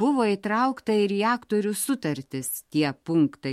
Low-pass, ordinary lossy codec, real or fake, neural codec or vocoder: 19.8 kHz; MP3, 64 kbps; fake; autoencoder, 48 kHz, 128 numbers a frame, DAC-VAE, trained on Japanese speech